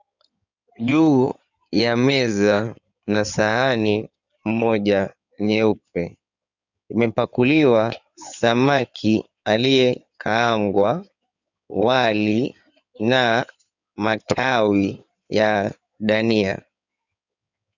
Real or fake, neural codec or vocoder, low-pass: fake; codec, 16 kHz in and 24 kHz out, 2.2 kbps, FireRedTTS-2 codec; 7.2 kHz